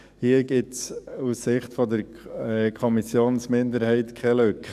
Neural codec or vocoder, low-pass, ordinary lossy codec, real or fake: codec, 44.1 kHz, 7.8 kbps, DAC; 14.4 kHz; none; fake